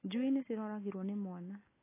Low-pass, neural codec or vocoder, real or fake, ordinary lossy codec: 3.6 kHz; none; real; AAC, 16 kbps